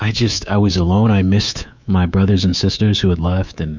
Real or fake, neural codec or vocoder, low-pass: fake; codec, 24 kHz, 3.1 kbps, DualCodec; 7.2 kHz